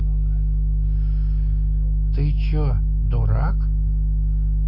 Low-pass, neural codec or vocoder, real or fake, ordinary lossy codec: 5.4 kHz; none; real; Opus, 64 kbps